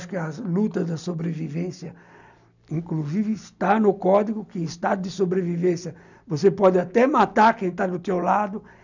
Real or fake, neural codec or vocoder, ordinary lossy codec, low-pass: real; none; none; 7.2 kHz